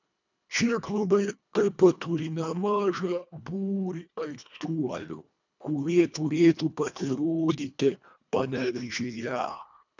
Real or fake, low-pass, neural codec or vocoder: fake; 7.2 kHz; codec, 24 kHz, 1.5 kbps, HILCodec